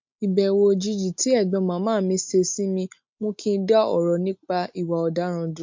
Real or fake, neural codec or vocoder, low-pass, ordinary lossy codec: real; none; 7.2 kHz; MP3, 48 kbps